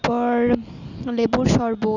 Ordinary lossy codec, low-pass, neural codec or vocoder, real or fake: none; 7.2 kHz; none; real